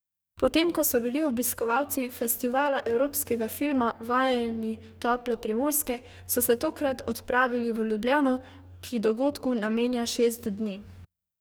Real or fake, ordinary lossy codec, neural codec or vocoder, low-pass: fake; none; codec, 44.1 kHz, 2.6 kbps, DAC; none